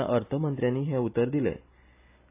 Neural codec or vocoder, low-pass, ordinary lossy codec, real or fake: none; 3.6 kHz; AAC, 24 kbps; real